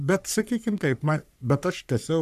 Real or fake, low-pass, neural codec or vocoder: fake; 14.4 kHz; codec, 44.1 kHz, 3.4 kbps, Pupu-Codec